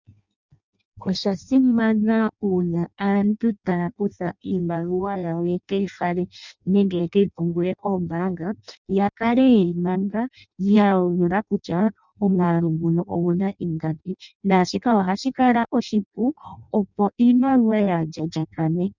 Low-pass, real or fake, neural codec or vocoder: 7.2 kHz; fake; codec, 16 kHz in and 24 kHz out, 0.6 kbps, FireRedTTS-2 codec